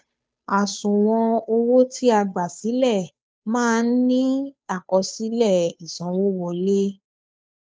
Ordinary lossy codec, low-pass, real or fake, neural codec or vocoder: none; none; fake; codec, 16 kHz, 2 kbps, FunCodec, trained on Chinese and English, 25 frames a second